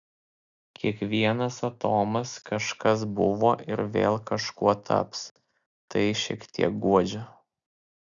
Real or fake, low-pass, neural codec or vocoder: real; 7.2 kHz; none